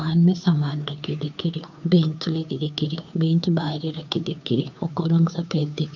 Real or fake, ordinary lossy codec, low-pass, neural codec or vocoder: fake; none; 7.2 kHz; codec, 16 kHz, 2 kbps, FunCodec, trained on Chinese and English, 25 frames a second